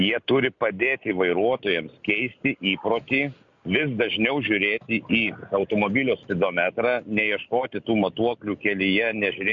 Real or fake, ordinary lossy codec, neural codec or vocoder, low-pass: real; MP3, 64 kbps; none; 7.2 kHz